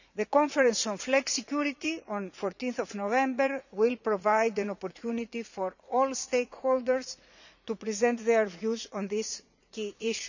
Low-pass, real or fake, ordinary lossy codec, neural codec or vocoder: 7.2 kHz; fake; none; vocoder, 44.1 kHz, 80 mel bands, Vocos